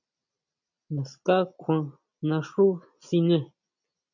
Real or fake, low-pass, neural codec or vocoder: real; 7.2 kHz; none